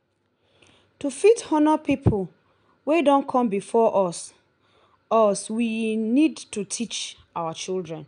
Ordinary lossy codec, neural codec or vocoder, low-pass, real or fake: none; none; 9.9 kHz; real